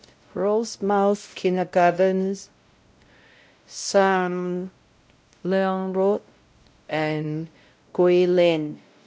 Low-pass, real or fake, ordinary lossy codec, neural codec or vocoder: none; fake; none; codec, 16 kHz, 0.5 kbps, X-Codec, WavLM features, trained on Multilingual LibriSpeech